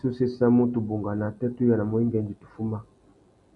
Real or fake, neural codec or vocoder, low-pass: real; none; 10.8 kHz